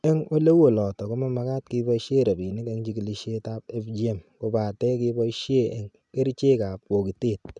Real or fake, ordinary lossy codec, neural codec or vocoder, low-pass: real; none; none; 10.8 kHz